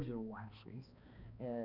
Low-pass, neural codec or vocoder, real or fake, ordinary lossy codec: 5.4 kHz; codec, 16 kHz, 2 kbps, X-Codec, HuBERT features, trained on balanced general audio; fake; none